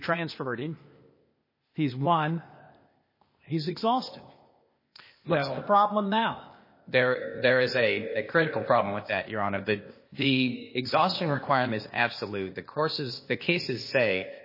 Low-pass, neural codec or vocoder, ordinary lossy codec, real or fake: 5.4 kHz; codec, 16 kHz, 0.8 kbps, ZipCodec; MP3, 24 kbps; fake